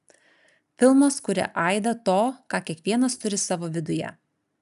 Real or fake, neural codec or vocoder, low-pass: real; none; 14.4 kHz